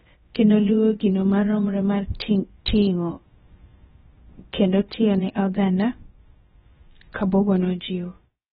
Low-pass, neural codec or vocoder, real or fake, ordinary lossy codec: 7.2 kHz; codec, 16 kHz, about 1 kbps, DyCAST, with the encoder's durations; fake; AAC, 16 kbps